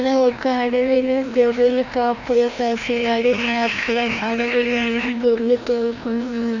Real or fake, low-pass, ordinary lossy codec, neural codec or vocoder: fake; 7.2 kHz; none; codec, 16 kHz, 1 kbps, FreqCodec, larger model